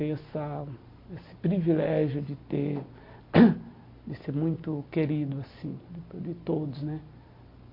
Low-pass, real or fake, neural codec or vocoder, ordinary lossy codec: 5.4 kHz; real; none; MP3, 32 kbps